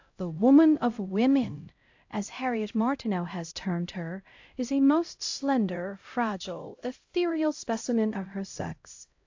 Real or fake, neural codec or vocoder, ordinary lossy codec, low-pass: fake; codec, 16 kHz, 0.5 kbps, X-Codec, HuBERT features, trained on LibriSpeech; AAC, 48 kbps; 7.2 kHz